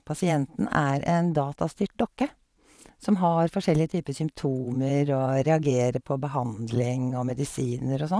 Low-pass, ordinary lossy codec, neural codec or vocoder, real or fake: none; none; vocoder, 22.05 kHz, 80 mel bands, Vocos; fake